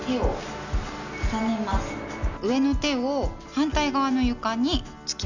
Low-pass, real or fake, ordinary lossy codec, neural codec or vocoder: 7.2 kHz; real; none; none